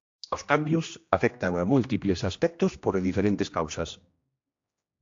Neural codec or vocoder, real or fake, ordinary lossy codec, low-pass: codec, 16 kHz, 1 kbps, X-Codec, HuBERT features, trained on general audio; fake; AAC, 48 kbps; 7.2 kHz